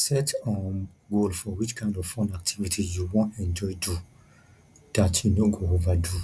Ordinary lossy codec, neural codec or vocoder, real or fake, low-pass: none; none; real; none